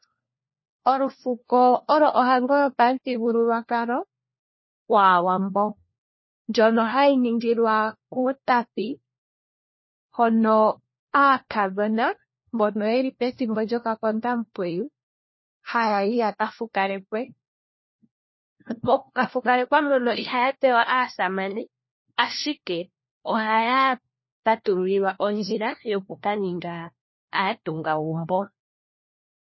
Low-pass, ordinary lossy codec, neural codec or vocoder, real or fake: 7.2 kHz; MP3, 24 kbps; codec, 16 kHz, 1 kbps, FunCodec, trained on LibriTTS, 50 frames a second; fake